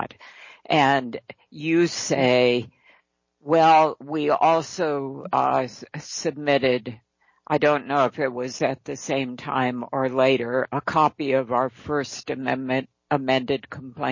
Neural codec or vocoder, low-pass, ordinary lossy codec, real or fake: none; 7.2 kHz; MP3, 32 kbps; real